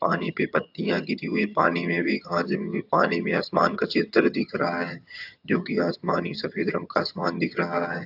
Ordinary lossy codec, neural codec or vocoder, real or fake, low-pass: AAC, 48 kbps; vocoder, 22.05 kHz, 80 mel bands, HiFi-GAN; fake; 5.4 kHz